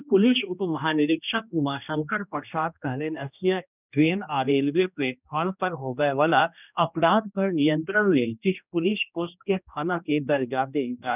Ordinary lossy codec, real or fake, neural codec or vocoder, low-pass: none; fake; codec, 16 kHz, 1 kbps, X-Codec, HuBERT features, trained on general audio; 3.6 kHz